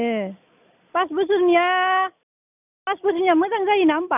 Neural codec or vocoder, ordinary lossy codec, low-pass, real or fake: none; none; 3.6 kHz; real